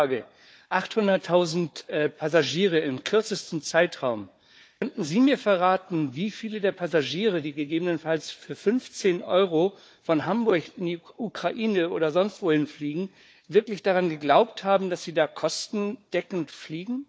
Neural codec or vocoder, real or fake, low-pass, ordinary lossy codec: codec, 16 kHz, 4 kbps, FunCodec, trained on Chinese and English, 50 frames a second; fake; none; none